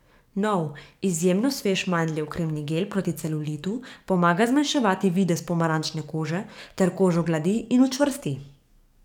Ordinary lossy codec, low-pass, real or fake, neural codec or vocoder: none; 19.8 kHz; fake; codec, 44.1 kHz, 7.8 kbps, DAC